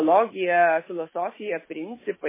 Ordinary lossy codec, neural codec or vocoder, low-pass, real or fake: MP3, 16 kbps; autoencoder, 48 kHz, 128 numbers a frame, DAC-VAE, trained on Japanese speech; 3.6 kHz; fake